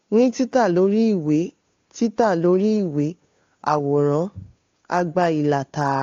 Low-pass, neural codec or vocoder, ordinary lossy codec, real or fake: 7.2 kHz; codec, 16 kHz, 2 kbps, FunCodec, trained on Chinese and English, 25 frames a second; AAC, 48 kbps; fake